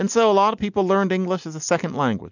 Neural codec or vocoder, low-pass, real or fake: none; 7.2 kHz; real